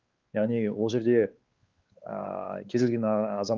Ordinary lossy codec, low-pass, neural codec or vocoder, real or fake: Opus, 32 kbps; 7.2 kHz; codec, 16 kHz, 4 kbps, X-Codec, WavLM features, trained on Multilingual LibriSpeech; fake